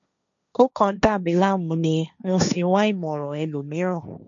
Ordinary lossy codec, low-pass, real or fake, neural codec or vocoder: none; 7.2 kHz; fake; codec, 16 kHz, 1.1 kbps, Voila-Tokenizer